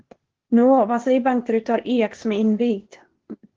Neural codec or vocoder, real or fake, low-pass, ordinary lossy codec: codec, 16 kHz, 0.8 kbps, ZipCodec; fake; 7.2 kHz; Opus, 16 kbps